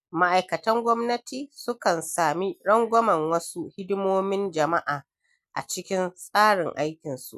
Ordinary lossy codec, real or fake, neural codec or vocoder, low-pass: none; real; none; 14.4 kHz